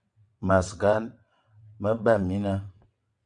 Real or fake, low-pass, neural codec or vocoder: fake; 9.9 kHz; vocoder, 22.05 kHz, 80 mel bands, WaveNeXt